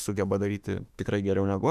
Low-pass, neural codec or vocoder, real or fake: 14.4 kHz; autoencoder, 48 kHz, 32 numbers a frame, DAC-VAE, trained on Japanese speech; fake